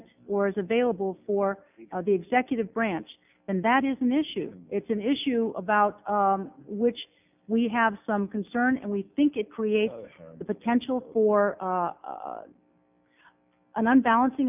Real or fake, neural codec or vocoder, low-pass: real; none; 3.6 kHz